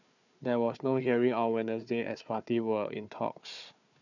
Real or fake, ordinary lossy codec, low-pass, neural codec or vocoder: fake; none; 7.2 kHz; codec, 16 kHz, 4 kbps, FunCodec, trained on Chinese and English, 50 frames a second